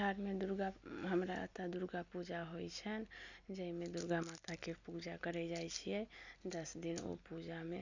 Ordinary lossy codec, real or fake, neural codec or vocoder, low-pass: none; real; none; 7.2 kHz